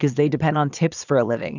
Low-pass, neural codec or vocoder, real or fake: 7.2 kHz; vocoder, 22.05 kHz, 80 mel bands, Vocos; fake